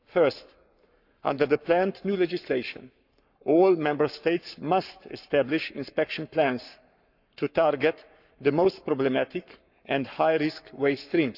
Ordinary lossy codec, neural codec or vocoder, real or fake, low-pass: none; codec, 44.1 kHz, 7.8 kbps, Pupu-Codec; fake; 5.4 kHz